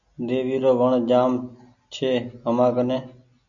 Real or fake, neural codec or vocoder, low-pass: real; none; 7.2 kHz